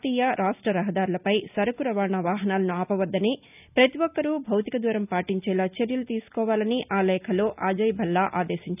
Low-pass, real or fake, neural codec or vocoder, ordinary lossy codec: 3.6 kHz; real; none; none